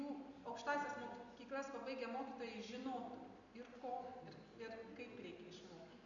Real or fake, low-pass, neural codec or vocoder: real; 7.2 kHz; none